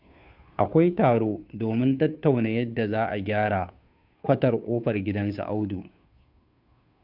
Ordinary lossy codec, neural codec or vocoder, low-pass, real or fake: none; codec, 16 kHz, 2 kbps, FunCodec, trained on Chinese and English, 25 frames a second; 5.4 kHz; fake